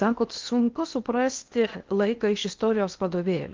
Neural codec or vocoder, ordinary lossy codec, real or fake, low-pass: codec, 16 kHz in and 24 kHz out, 0.8 kbps, FocalCodec, streaming, 65536 codes; Opus, 32 kbps; fake; 7.2 kHz